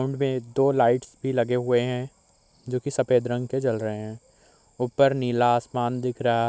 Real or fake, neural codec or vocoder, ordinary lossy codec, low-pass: real; none; none; none